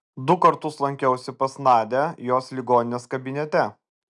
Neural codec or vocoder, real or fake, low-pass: none; real; 10.8 kHz